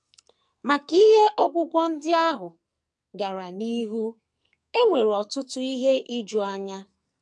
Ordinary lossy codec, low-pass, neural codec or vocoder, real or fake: MP3, 96 kbps; 10.8 kHz; codec, 44.1 kHz, 2.6 kbps, SNAC; fake